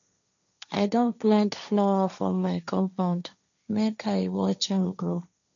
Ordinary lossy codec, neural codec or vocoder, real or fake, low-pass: none; codec, 16 kHz, 1.1 kbps, Voila-Tokenizer; fake; 7.2 kHz